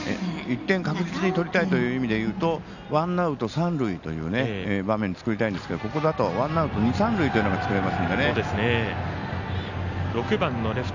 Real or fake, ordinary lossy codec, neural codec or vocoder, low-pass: real; none; none; 7.2 kHz